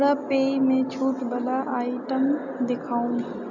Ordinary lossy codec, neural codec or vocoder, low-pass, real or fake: none; none; 7.2 kHz; real